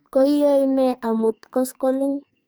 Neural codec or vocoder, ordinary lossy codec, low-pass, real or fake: codec, 44.1 kHz, 2.6 kbps, SNAC; none; none; fake